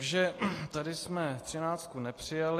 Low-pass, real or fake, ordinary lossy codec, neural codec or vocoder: 14.4 kHz; real; AAC, 48 kbps; none